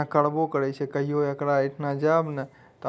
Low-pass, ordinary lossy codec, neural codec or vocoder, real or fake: none; none; none; real